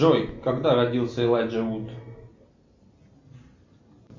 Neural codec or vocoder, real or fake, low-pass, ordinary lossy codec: none; real; 7.2 kHz; MP3, 48 kbps